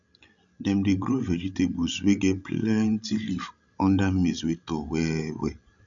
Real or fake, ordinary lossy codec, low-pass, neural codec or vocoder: fake; none; 7.2 kHz; codec, 16 kHz, 16 kbps, FreqCodec, larger model